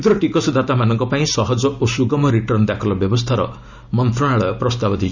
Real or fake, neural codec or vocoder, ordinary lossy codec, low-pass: real; none; none; 7.2 kHz